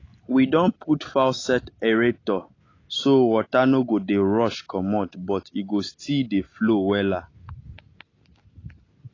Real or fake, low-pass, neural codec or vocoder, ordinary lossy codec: real; 7.2 kHz; none; AAC, 32 kbps